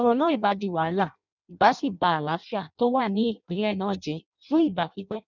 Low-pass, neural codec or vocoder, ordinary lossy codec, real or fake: 7.2 kHz; codec, 16 kHz in and 24 kHz out, 0.6 kbps, FireRedTTS-2 codec; none; fake